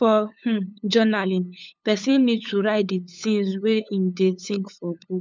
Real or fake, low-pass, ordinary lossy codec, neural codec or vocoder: fake; none; none; codec, 16 kHz, 8 kbps, FunCodec, trained on LibriTTS, 25 frames a second